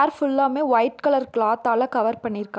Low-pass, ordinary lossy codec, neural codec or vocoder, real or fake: none; none; none; real